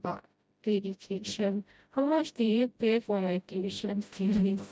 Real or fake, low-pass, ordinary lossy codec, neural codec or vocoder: fake; none; none; codec, 16 kHz, 0.5 kbps, FreqCodec, smaller model